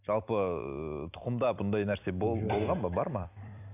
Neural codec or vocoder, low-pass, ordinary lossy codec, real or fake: vocoder, 44.1 kHz, 128 mel bands every 256 samples, BigVGAN v2; 3.6 kHz; none; fake